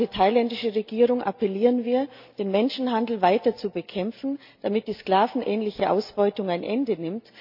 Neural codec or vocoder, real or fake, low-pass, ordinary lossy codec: none; real; 5.4 kHz; MP3, 48 kbps